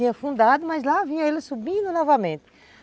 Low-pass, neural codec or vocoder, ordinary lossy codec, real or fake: none; none; none; real